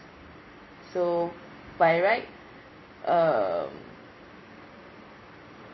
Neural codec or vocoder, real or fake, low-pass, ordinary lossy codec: vocoder, 44.1 kHz, 128 mel bands every 256 samples, BigVGAN v2; fake; 7.2 kHz; MP3, 24 kbps